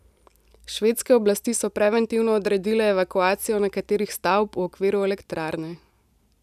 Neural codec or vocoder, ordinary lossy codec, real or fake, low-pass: none; none; real; 14.4 kHz